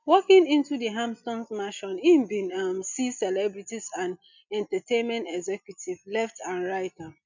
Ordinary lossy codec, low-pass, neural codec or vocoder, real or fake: none; 7.2 kHz; none; real